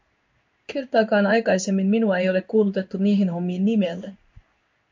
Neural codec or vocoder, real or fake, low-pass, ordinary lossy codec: codec, 16 kHz in and 24 kHz out, 1 kbps, XY-Tokenizer; fake; 7.2 kHz; MP3, 48 kbps